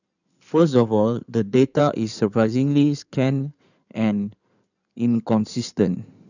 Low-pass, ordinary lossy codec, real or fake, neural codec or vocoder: 7.2 kHz; none; fake; codec, 16 kHz in and 24 kHz out, 2.2 kbps, FireRedTTS-2 codec